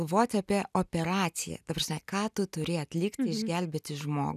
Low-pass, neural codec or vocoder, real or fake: 14.4 kHz; none; real